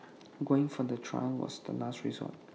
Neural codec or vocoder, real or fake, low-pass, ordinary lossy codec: none; real; none; none